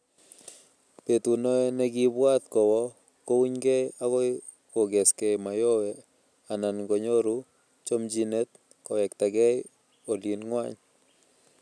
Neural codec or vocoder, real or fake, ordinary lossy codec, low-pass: none; real; none; none